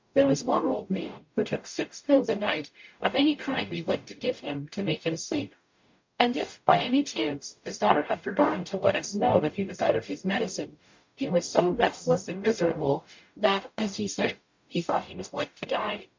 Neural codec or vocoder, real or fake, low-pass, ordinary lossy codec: codec, 44.1 kHz, 0.9 kbps, DAC; fake; 7.2 kHz; MP3, 48 kbps